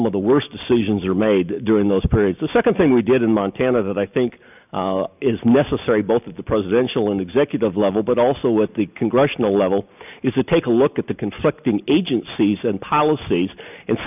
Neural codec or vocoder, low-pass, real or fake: none; 3.6 kHz; real